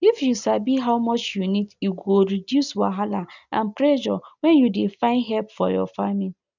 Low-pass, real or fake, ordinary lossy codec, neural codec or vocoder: 7.2 kHz; real; none; none